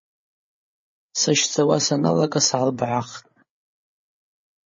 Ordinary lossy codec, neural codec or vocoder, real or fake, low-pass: MP3, 32 kbps; codec, 16 kHz, 8 kbps, FreqCodec, larger model; fake; 7.2 kHz